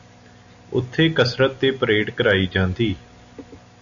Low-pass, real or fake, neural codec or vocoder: 7.2 kHz; real; none